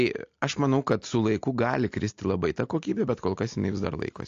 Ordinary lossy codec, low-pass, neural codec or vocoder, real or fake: AAC, 48 kbps; 7.2 kHz; none; real